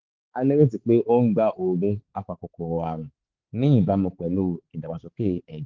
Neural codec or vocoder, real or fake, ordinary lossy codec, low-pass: codec, 16 kHz, 4 kbps, X-Codec, HuBERT features, trained on balanced general audio; fake; Opus, 16 kbps; 7.2 kHz